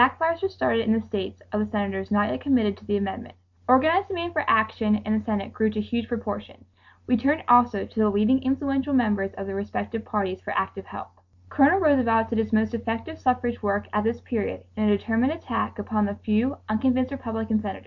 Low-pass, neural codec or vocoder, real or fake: 7.2 kHz; none; real